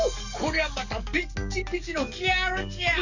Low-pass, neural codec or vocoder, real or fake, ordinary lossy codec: 7.2 kHz; codec, 44.1 kHz, 7.8 kbps, DAC; fake; none